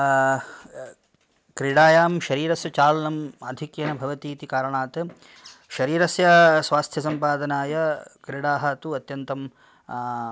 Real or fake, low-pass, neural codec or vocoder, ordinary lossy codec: real; none; none; none